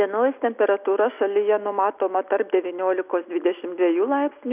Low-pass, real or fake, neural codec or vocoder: 3.6 kHz; real; none